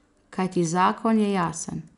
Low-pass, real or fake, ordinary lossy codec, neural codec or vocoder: 10.8 kHz; real; none; none